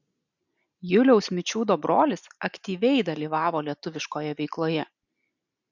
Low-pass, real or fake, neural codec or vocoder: 7.2 kHz; real; none